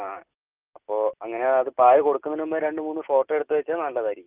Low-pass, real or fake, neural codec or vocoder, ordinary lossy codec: 3.6 kHz; real; none; Opus, 24 kbps